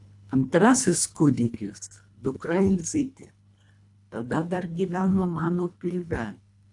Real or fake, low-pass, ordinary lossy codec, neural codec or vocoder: fake; 10.8 kHz; AAC, 64 kbps; codec, 24 kHz, 1.5 kbps, HILCodec